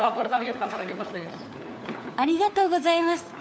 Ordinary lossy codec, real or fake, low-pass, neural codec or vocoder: none; fake; none; codec, 16 kHz, 4 kbps, FunCodec, trained on LibriTTS, 50 frames a second